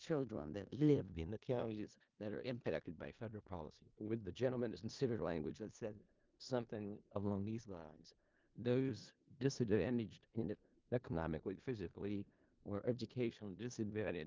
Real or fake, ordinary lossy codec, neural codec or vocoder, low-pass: fake; Opus, 32 kbps; codec, 16 kHz in and 24 kHz out, 0.4 kbps, LongCat-Audio-Codec, four codebook decoder; 7.2 kHz